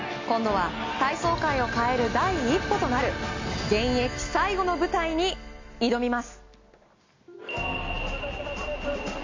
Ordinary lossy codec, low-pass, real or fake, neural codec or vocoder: MP3, 64 kbps; 7.2 kHz; real; none